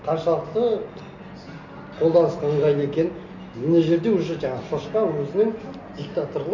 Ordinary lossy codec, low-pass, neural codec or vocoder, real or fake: none; 7.2 kHz; none; real